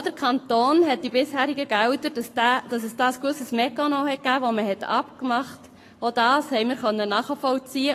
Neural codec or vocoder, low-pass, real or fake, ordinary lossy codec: codec, 44.1 kHz, 7.8 kbps, Pupu-Codec; 14.4 kHz; fake; AAC, 48 kbps